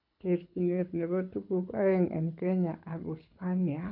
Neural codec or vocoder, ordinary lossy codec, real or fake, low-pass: codec, 24 kHz, 6 kbps, HILCodec; none; fake; 5.4 kHz